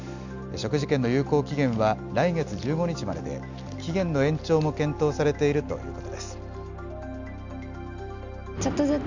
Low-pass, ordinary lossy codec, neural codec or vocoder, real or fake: 7.2 kHz; none; none; real